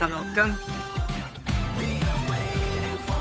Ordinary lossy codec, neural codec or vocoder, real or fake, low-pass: none; codec, 16 kHz, 8 kbps, FunCodec, trained on Chinese and English, 25 frames a second; fake; none